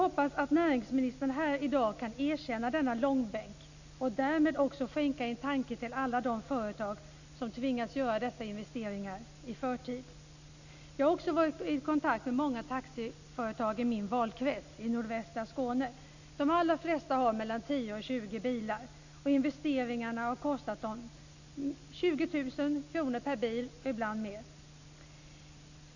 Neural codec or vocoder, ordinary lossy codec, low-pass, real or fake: none; none; 7.2 kHz; real